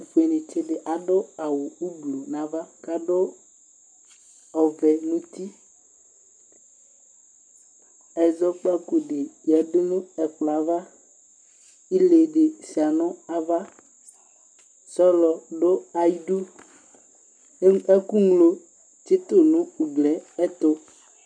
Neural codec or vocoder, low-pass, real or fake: none; 9.9 kHz; real